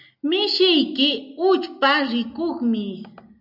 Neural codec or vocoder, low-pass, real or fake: none; 5.4 kHz; real